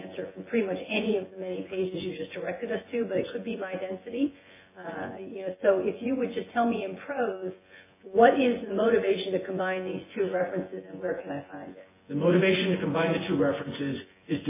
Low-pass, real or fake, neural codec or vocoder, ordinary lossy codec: 3.6 kHz; fake; vocoder, 24 kHz, 100 mel bands, Vocos; MP3, 16 kbps